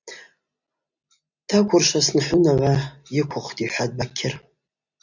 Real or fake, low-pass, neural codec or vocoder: real; 7.2 kHz; none